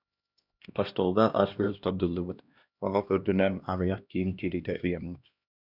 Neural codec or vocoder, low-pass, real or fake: codec, 16 kHz, 1 kbps, X-Codec, HuBERT features, trained on LibriSpeech; 5.4 kHz; fake